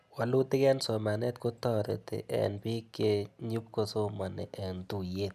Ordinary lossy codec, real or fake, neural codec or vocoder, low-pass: none; real; none; 14.4 kHz